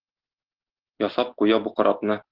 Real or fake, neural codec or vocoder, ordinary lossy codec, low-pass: real; none; Opus, 16 kbps; 5.4 kHz